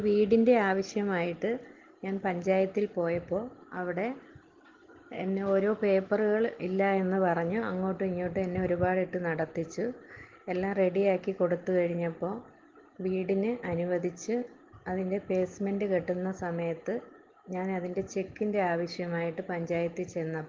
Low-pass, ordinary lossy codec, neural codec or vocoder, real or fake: 7.2 kHz; Opus, 16 kbps; none; real